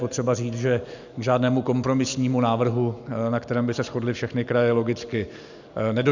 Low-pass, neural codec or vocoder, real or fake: 7.2 kHz; none; real